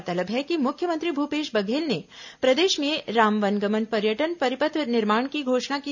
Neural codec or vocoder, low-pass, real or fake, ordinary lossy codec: none; 7.2 kHz; real; none